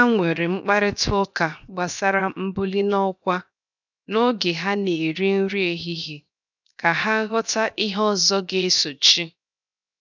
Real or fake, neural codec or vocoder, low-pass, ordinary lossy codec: fake; codec, 16 kHz, 0.7 kbps, FocalCodec; 7.2 kHz; none